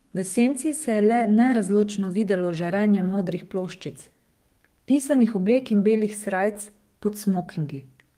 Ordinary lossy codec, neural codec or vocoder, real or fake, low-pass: Opus, 32 kbps; codec, 32 kHz, 1.9 kbps, SNAC; fake; 14.4 kHz